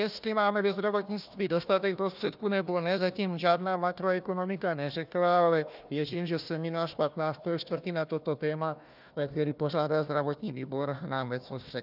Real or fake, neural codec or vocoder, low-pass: fake; codec, 16 kHz, 1 kbps, FunCodec, trained on Chinese and English, 50 frames a second; 5.4 kHz